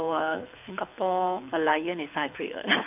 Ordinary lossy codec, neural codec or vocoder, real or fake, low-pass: none; codec, 24 kHz, 6 kbps, HILCodec; fake; 3.6 kHz